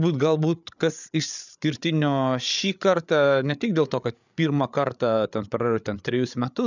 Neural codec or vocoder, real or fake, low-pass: codec, 16 kHz, 8 kbps, FreqCodec, larger model; fake; 7.2 kHz